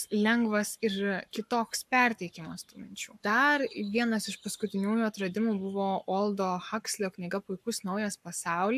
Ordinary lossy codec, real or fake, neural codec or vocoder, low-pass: AAC, 96 kbps; fake; codec, 44.1 kHz, 7.8 kbps, Pupu-Codec; 14.4 kHz